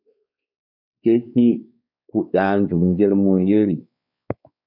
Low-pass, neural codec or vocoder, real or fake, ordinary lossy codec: 5.4 kHz; codec, 16 kHz, 4 kbps, X-Codec, WavLM features, trained on Multilingual LibriSpeech; fake; MP3, 48 kbps